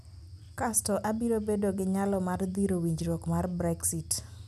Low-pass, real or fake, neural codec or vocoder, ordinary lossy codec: 14.4 kHz; real; none; none